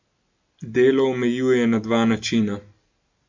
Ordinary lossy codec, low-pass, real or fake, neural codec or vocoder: MP3, 48 kbps; 7.2 kHz; real; none